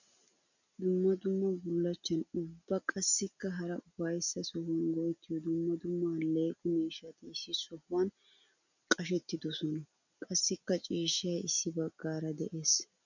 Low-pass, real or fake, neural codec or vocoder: 7.2 kHz; real; none